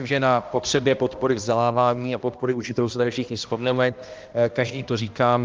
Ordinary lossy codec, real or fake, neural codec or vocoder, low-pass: Opus, 32 kbps; fake; codec, 16 kHz, 1 kbps, X-Codec, HuBERT features, trained on balanced general audio; 7.2 kHz